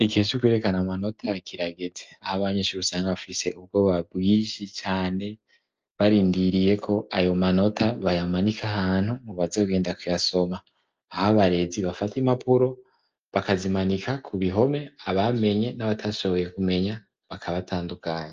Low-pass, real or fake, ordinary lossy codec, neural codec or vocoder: 7.2 kHz; real; Opus, 24 kbps; none